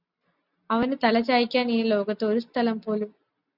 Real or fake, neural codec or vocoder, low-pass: real; none; 5.4 kHz